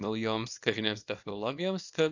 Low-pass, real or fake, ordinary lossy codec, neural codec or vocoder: 7.2 kHz; fake; AAC, 48 kbps; codec, 24 kHz, 0.9 kbps, WavTokenizer, small release